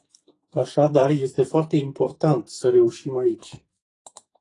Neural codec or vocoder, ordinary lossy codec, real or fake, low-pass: codec, 44.1 kHz, 2.6 kbps, SNAC; AAC, 32 kbps; fake; 10.8 kHz